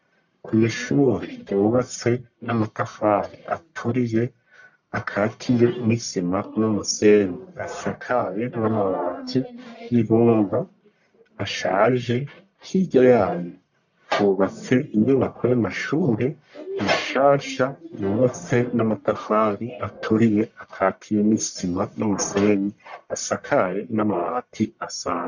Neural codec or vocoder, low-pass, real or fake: codec, 44.1 kHz, 1.7 kbps, Pupu-Codec; 7.2 kHz; fake